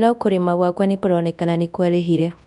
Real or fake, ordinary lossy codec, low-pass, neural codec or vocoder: fake; none; 10.8 kHz; codec, 24 kHz, 0.9 kbps, WavTokenizer, large speech release